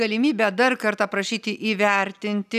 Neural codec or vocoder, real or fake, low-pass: none; real; 14.4 kHz